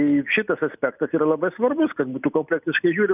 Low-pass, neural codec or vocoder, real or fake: 3.6 kHz; none; real